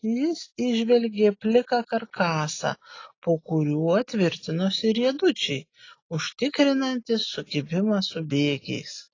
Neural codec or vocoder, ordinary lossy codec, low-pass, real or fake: none; AAC, 32 kbps; 7.2 kHz; real